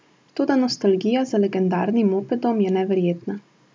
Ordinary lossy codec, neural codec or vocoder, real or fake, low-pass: none; none; real; none